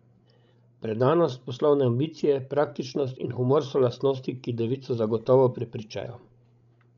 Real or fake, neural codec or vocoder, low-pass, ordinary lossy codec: fake; codec, 16 kHz, 16 kbps, FreqCodec, larger model; 7.2 kHz; MP3, 96 kbps